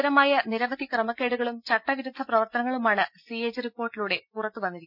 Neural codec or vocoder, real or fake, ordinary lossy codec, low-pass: none; real; none; 5.4 kHz